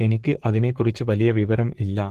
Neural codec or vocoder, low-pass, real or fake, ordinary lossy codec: codec, 44.1 kHz, 3.4 kbps, Pupu-Codec; 14.4 kHz; fake; Opus, 16 kbps